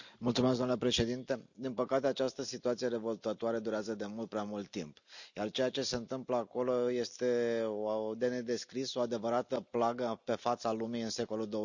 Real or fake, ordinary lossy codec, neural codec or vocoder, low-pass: real; none; none; 7.2 kHz